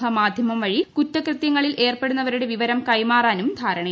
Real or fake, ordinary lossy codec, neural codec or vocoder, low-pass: real; none; none; none